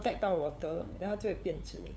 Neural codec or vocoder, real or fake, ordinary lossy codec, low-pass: codec, 16 kHz, 8 kbps, FunCodec, trained on LibriTTS, 25 frames a second; fake; none; none